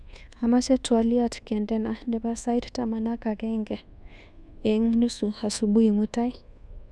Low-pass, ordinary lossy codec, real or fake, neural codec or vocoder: none; none; fake; codec, 24 kHz, 1.2 kbps, DualCodec